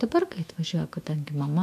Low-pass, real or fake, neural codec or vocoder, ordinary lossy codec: 14.4 kHz; fake; autoencoder, 48 kHz, 128 numbers a frame, DAC-VAE, trained on Japanese speech; MP3, 96 kbps